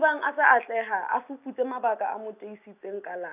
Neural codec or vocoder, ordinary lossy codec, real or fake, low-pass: none; none; real; 3.6 kHz